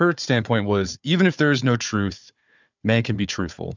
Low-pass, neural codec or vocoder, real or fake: 7.2 kHz; codec, 16 kHz, 4 kbps, FunCodec, trained on Chinese and English, 50 frames a second; fake